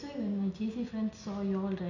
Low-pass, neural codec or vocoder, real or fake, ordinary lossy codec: 7.2 kHz; none; real; none